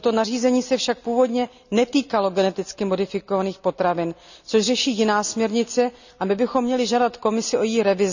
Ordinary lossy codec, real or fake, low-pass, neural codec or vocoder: none; real; 7.2 kHz; none